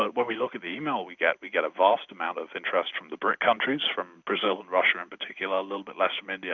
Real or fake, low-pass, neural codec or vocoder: real; 7.2 kHz; none